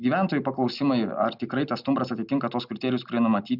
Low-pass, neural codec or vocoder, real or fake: 5.4 kHz; none; real